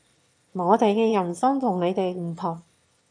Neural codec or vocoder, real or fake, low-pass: autoencoder, 22.05 kHz, a latent of 192 numbers a frame, VITS, trained on one speaker; fake; 9.9 kHz